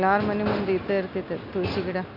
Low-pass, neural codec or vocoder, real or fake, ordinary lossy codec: 5.4 kHz; none; real; none